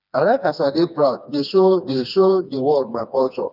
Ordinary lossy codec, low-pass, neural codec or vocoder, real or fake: none; 5.4 kHz; codec, 16 kHz, 2 kbps, FreqCodec, smaller model; fake